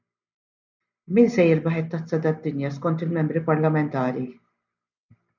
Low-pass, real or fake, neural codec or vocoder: 7.2 kHz; real; none